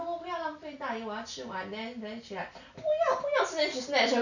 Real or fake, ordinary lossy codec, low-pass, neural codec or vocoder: real; none; 7.2 kHz; none